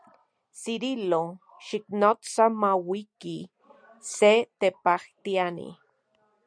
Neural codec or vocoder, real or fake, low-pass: none; real; 9.9 kHz